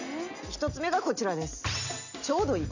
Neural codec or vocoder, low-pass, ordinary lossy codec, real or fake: none; 7.2 kHz; none; real